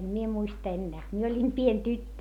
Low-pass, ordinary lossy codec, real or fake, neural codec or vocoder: 19.8 kHz; none; real; none